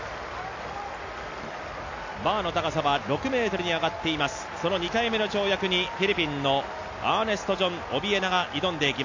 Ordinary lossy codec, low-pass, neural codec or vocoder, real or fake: AAC, 48 kbps; 7.2 kHz; none; real